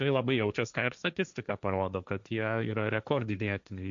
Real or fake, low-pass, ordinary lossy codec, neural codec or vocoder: fake; 7.2 kHz; MP3, 64 kbps; codec, 16 kHz, 1.1 kbps, Voila-Tokenizer